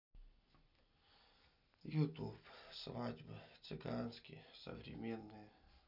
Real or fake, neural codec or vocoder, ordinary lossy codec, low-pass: real; none; none; 5.4 kHz